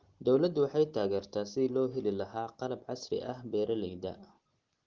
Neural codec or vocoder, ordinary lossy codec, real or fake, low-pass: none; Opus, 16 kbps; real; 7.2 kHz